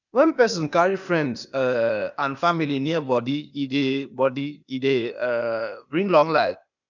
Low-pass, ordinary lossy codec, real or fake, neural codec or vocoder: 7.2 kHz; none; fake; codec, 16 kHz, 0.8 kbps, ZipCodec